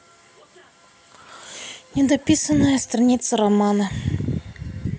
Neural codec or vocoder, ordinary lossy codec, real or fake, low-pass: none; none; real; none